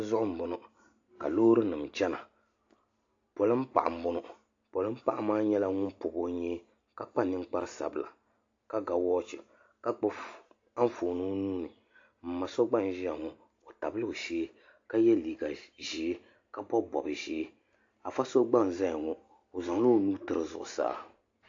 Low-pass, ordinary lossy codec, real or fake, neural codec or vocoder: 7.2 kHz; AAC, 48 kbps; real; none